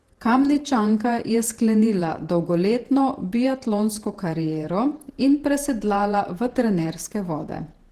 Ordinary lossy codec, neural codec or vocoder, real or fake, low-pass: Opus, 16 kbps; vocoder, 48 kHz, 128 mel bands, Vocos; fake; 14.4 kHz